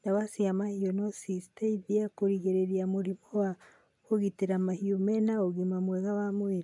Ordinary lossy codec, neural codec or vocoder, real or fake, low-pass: MP3, 96 kbps; none; real; 10.8 kHz